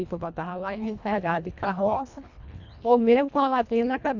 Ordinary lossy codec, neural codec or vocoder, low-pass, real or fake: none; codec, 24 kHz, 1.5 kbps, HILCodec; 7.2 kHz; fake